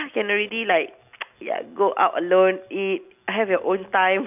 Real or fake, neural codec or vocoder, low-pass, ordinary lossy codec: real; none; 3.6 kHz; none